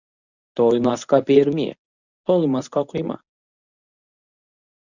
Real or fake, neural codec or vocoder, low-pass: fake; codec, 24 kHz, 0.9 kbps, WavTokenizer, medium speech release version 1; 7.2 kHz